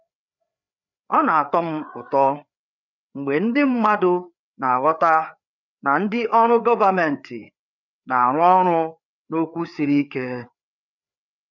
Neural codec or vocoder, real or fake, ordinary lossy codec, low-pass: codec, 16 kHz, 4 kbps, FreqCodec, larger model; fake; none; 7.2 kHz